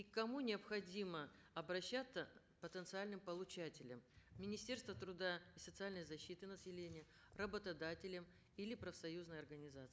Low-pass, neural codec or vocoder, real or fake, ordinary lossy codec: none; none; real; none